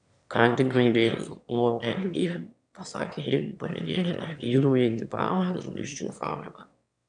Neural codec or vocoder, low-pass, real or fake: autoencoder, 22.05 kHz, a latent of 192 numbers a frame, VITS, trained on one speaker; 9.9 kHz; fake